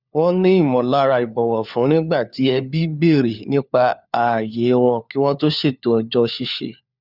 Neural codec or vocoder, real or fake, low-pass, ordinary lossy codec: codec, 16 kHz, 4 kbps, FunCodec, trained on LibriTTS, 50 frames a second; fake; 5.4 kHz; Opus, 64 kbps